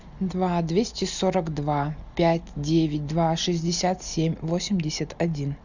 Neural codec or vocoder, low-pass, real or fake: none; 7.2 kHz; real